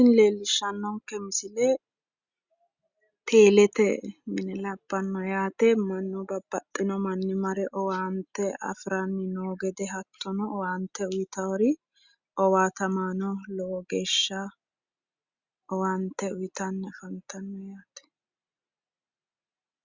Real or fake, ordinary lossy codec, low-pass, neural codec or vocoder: real; Opus, 64 kbps; 7.2 kHz; none